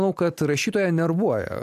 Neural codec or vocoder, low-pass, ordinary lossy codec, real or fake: none; 14.4 kHz; AAC, 96 kbps; real